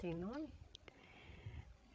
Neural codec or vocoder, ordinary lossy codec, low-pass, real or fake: codec, 16 kHz, 16 kbps, FreqCodec, larger model; none; none; fake